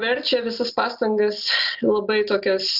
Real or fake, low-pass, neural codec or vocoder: real; 5.4 kHz; none